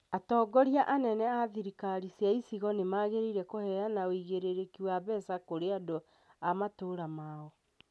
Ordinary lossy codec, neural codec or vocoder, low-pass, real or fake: none; none; none; real